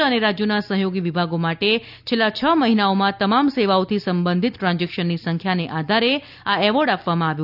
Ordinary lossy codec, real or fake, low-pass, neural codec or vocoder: none; real; 5.4 kHz; none